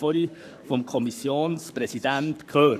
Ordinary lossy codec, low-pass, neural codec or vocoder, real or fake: none; 14.4 kHz; codec, 44.1 kHz, 7.8 kbps, Pupu-Codec; fake